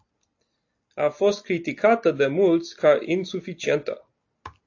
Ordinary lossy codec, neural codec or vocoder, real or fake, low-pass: AAC, 48 kbps; none; real; 7.2 kHz